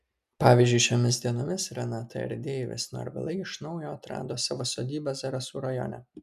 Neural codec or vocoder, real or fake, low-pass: none; real; 14.4 kHz